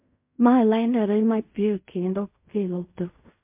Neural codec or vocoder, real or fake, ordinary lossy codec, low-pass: codec, 16 kHz in and 24 kHz out, 0.4 kbps, LongCat-Audio-Codec, fine tuned four codebook decoder; fake; MP3, 32 kbps; 3.6 kHz